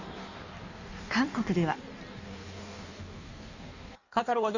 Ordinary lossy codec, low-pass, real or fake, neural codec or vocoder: none; 7.2 kHz; fake; codec, 16 kHz in and 24 kHz out, 1.1 kbps, FireRedTTS-2 codec